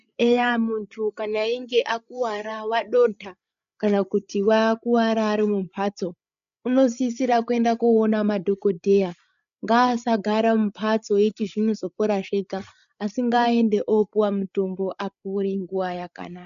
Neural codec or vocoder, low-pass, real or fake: codec, 16 kHz, 8 kbps, FreqCodec, larger model; 7.2 kHz; fake